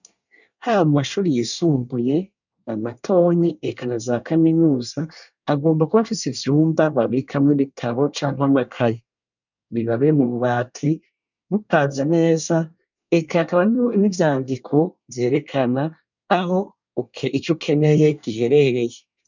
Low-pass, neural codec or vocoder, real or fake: 7.2 kHz; codec, 24 kHz, 1 kbps, SNAC; fake